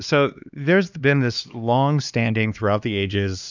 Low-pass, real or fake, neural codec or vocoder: 7.2 kHz; fake; codec, 16 kHz, 2 kbps, X-Codec, HuBERT features, trained on LibriSpeech